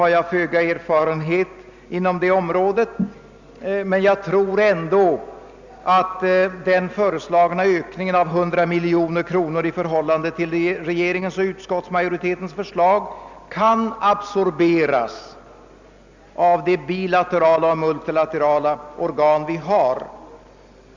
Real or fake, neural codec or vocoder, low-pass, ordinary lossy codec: real; none; 7.2 kHz; Opus, 64 kbps